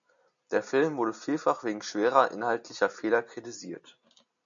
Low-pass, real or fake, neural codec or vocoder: 7.2 kHz; real; none